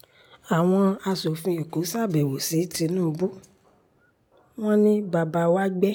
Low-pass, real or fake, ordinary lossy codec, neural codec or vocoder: none; real; none; none